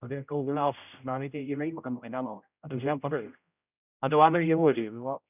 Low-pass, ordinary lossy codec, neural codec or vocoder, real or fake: 3.6 kHz; none; codec, 16 kHz, 0.5 kbps, X-Codec, HuBERT features, trained on general audio; fake